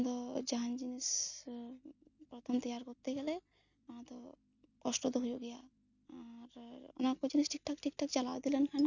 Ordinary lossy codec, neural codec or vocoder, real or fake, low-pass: none; none; real; 7.2 kHz